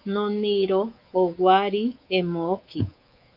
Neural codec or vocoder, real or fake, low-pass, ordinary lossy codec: codec, 24 kHz, 3.1 kbps, DualCodec; fake; 5.4 kHz; Opus, 24 kbps